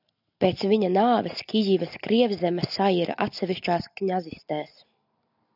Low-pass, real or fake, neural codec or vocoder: 5.4 kHz; real; none